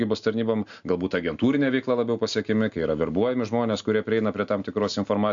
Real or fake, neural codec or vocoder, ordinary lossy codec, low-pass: real; none; AAC, 64 kbps; 7.2 kHz